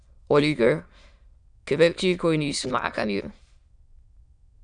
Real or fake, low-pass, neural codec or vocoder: fake; 9.9 kHz; autoencoder, 22.05 kHz, a latent of 192 numbers a frame, VITS, trained on many speakers